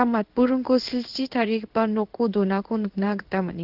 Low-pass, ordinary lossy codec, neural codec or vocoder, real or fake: 5.4 kHz; Opus, 16 kbps; codec, 16 kHz in and 24 kHz out, 1 kbps, XY-Tokenizer; fake